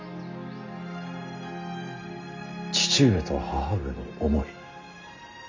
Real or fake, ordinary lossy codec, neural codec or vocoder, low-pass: real; MP3, 48 kbps; none; 7.2 kHz